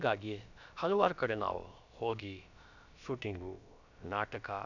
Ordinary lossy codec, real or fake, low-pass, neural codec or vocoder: none; fake; 7.2 kHz; codec, 16 kHz, about 1 kbps, DyCAST, with the encoder's durations